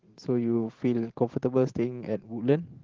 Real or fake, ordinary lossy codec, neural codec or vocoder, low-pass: fake; Opus, 16 kbps; vocoder, 44.1 kHz, 128 mel bands every 512 samples, BigVGAN v2; 7.2 kHz